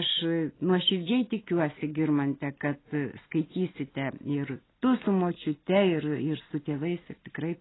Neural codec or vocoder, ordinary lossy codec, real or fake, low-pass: none; AAC, 16 kbps; real; 7.2 kHz